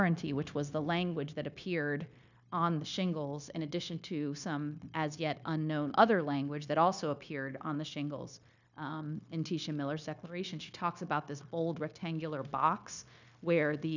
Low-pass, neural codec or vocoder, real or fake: 7.2 kHz; codec, 16 kHz, 0.9 kbps, LongCat-Audio-Codec; fake